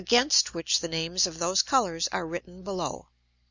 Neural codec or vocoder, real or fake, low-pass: none; real; 7.2 kHz